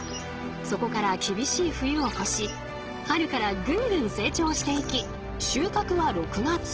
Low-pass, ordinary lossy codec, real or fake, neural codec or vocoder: 7.2 kHz; Opus, 16 kbps; real; none